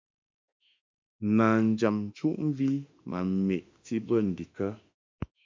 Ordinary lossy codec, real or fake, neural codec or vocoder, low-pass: MP3, 64 kbps; fake; autoencoder, 48 kHz, 32 numbers a frame, DAC-VAE, trained on Japanese speech; 7.2 kHz